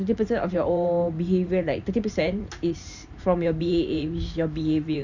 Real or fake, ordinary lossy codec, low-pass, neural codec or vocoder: fake; none; 7.2 kHz; vocoder, 44.1 kHz, 128 mel bands every 512 samples, BigVGAN v2